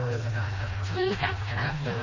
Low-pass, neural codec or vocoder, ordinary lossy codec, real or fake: 7.2 kHz; codec, 16 kHz, 1 kbps, FreqCodec, smaller model; MP3, 32 kbps; fake